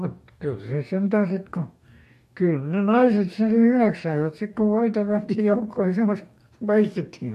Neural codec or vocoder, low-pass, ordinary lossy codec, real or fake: codec, 44.1 kHz, 2.6 kbps, DAC; 14.4 kHz; MP3, 64 kbps; fake